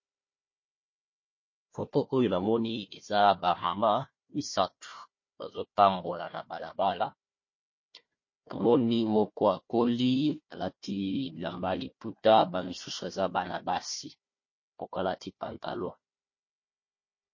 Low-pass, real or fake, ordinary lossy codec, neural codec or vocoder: 7.2 kHz; fake; MP3, 32 kbps; codec, 16 kHz, 1 kbps, FunCodec, trained on Chinese and English, 50 frames a second